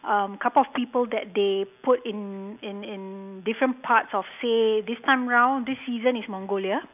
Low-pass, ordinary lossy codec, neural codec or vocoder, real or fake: 3.6 kHz; none; none; real